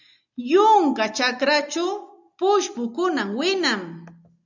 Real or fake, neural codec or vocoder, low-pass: real; none; 7.2 kHz